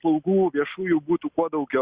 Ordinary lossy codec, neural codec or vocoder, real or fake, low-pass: Opus, 64 kbps; none; real; 3.6 kHz